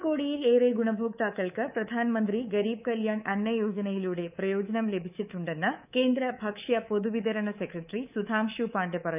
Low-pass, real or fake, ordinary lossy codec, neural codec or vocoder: 3.6 kHz; fake; none; codec, 24 kHz, 3.1 kbps, DualCodec